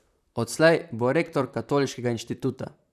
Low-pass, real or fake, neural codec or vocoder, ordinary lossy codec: 14.4 kHz; fake; vocoder, 44.1 kHz, 128 mel bands, Pupu-Vocoder; none